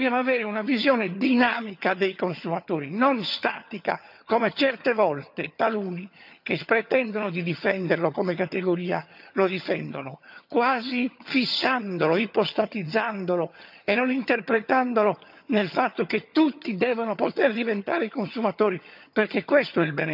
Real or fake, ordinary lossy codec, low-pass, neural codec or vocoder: fake; none; 5.4 kHz; vocoder, 22.05 kHz, 80 mel bands, HiFi-GAN